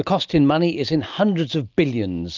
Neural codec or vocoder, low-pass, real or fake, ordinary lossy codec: none; 7.2 kHz; real; Opus, 24 kbps